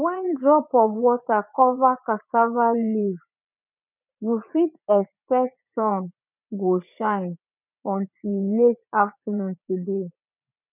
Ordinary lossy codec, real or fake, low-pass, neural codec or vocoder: none; fake; 3.6 kHz; vocoder, 44.1 kHz, 80 mel bands, Vocos